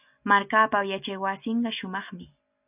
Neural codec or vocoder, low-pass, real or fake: none; 3.6 kHz; real